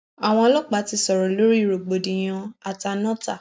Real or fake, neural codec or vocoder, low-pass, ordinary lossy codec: real; none; none; none